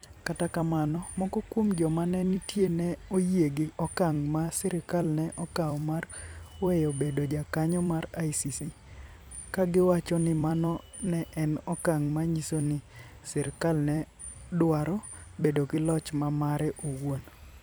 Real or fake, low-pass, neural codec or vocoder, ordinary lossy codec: fake; none; vocoder, 44.1 kHz, 128 mel bands every 256 samples, BigVGAN v2; none